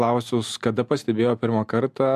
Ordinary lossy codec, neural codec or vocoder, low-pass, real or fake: MP3, 96 kbps; none; 14.4 kHz; real